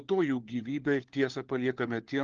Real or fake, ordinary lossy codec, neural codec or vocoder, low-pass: fake; Opus, 16 kbps; codec, 16 kHz, 4 kbps, FunCodec, trained on LibriTTS, 50 frames a second; 7.2 kHz